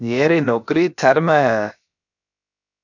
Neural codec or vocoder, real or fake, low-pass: codec, 16 kHz, about 1 kbps, DyCAST, with the encoder's durations; fake; 7.2 kHz